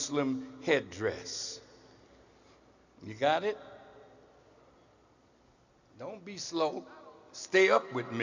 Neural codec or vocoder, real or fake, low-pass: none; real; 7.2 kHz